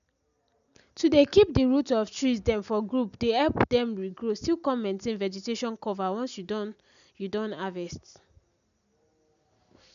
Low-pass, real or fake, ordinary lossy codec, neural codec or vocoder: 7.2 kHz; real; none; none